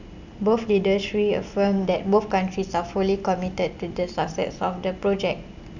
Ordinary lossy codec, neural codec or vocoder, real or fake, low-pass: none; none; real; 7.2 kHz